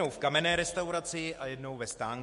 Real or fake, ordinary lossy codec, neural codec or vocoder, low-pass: fake; MP3, 48 kbps; autoencoder, 48 kHz, 128 numbers a frame, DAC-VAE, trained on Japanese speech; 14.4 kHz